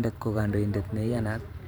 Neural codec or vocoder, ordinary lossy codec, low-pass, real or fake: vocoder, 44.1 kHz, 128 mel bands every 512 samples, BigVGAN v2; none; none; fake